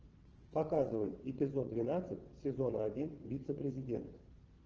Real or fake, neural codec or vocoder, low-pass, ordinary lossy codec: fake; vocoder, 22.05 kHz, 80 mel bands, WaveNeXt; 7.2 kHz; Opus, 16 kbps